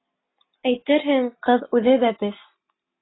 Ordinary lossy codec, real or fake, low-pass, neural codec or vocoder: AAC, 16 kbps; real; 7.2 kHz; none